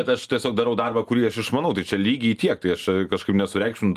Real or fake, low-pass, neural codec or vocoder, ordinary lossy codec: real; 14.4 kHz; none; Opus, 32 kbps